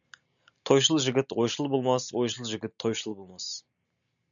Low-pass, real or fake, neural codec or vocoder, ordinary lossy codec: 7.2 kHz; real; none; MP3, 96 kbps